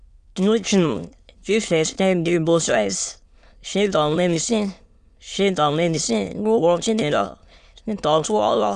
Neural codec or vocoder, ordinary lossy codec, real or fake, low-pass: autoencoder, 22.05 kHz, a latent of 192 numbers a frame, VITS, trained on many speakers; Opus, 64 kbps; fake; 9.9 kHz